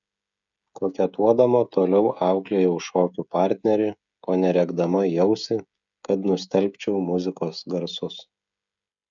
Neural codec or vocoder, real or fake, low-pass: codec, 16 kHz, 16 kbps, FreqCodec, smaller model; fake; 7.2 kHz